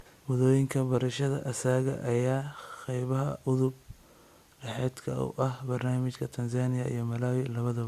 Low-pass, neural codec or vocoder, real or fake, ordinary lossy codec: 14.4 kHz; none; real; Opus, 64 kbps